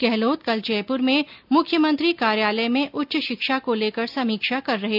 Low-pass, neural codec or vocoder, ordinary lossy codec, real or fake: 5.4 kHz; none; AAC, 48 kbps; real